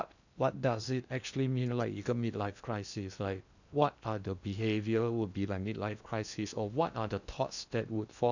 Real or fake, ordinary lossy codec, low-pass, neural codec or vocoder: fake; none; 7.2 kHz; codec, 16 kHz in and 24 kHz out, 0.8 kbps, FocalCodec, streaming, 65536 codes